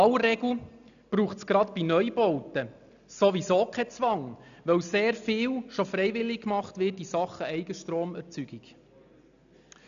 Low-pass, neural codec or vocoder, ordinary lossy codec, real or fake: 7.2 kHz; none; none; real